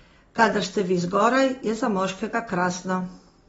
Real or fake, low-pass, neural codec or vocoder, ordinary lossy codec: real; 19.8 kHz; none; AAC, 24 kbps